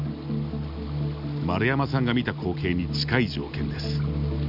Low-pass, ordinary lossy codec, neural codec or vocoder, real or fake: 5.4 kHz; none; none; real